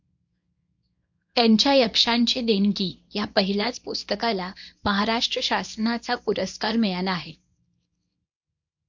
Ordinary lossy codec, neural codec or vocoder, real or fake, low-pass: MP3, 48 kbps; codec, 24 kHz, 0.9 kbps, WavTokenizer, small release; fake; 7.2 kHz